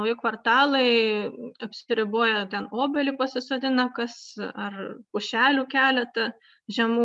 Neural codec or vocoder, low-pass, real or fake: none; 10.8 kHz; real